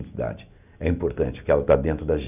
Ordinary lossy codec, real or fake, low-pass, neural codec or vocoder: none; real; 3.6 kHz; none